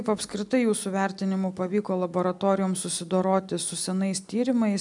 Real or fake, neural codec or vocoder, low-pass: real; none; 10.8 kHz